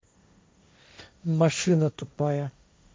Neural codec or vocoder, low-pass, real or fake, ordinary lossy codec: codec, 16 kHz, 1.1 kbps, Voila-Tokenizer; none; fake; none